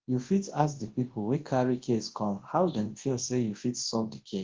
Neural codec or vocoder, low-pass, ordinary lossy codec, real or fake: codec, 24 kHz, 0.9 kbps, WavTokenizer, large speech release; 7.2 kHz; Opus, 16 kbps; fake